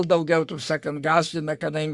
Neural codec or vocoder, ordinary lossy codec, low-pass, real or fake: codec, 44.1 kHz, 3.4 kbps, Pupu-Codec; Opus, 64 kbps; 10.8 kHz; fake